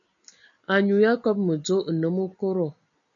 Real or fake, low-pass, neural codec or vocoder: real; 7.2 kHz; none